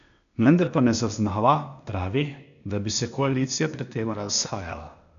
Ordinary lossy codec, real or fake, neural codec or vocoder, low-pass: none; fake; codec, 16 kHz, 0.8 kbps, ZipCodec; 7.2 kHz